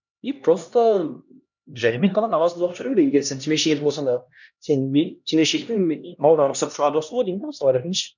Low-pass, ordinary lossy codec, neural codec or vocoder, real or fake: 7.2 kHz; none; codec, 16 kHz, 1 kbps, X-Codec, HuBERT features, trained on LibriSpeech; fake